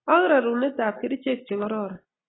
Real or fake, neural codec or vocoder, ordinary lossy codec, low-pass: real; none; AAC, 16 kbps; 7.2 kHz